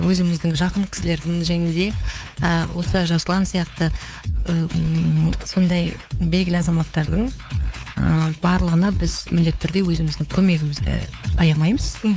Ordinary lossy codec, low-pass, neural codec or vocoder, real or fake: none; none; codec, 16 kHz, 4 kbps, X-Codec, WavLM features, trained on Multilingual LibriSpeech; fake